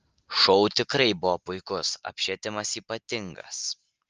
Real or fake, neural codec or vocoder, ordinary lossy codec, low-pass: real; none; Opus, 24 kbps; 7.2 kHz